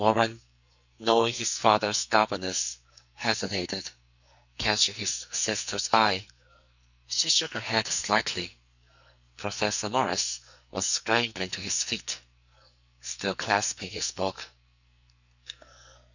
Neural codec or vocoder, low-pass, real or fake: codec, 44.1 kHz, 2.6 kbps, SNAC; 7.2 kHz; fake